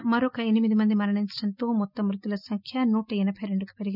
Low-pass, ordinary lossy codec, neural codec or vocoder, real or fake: 5.4 kHz; none; none; real